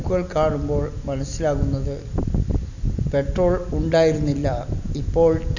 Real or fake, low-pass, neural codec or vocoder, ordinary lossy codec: real; 7.2 kHz; none; none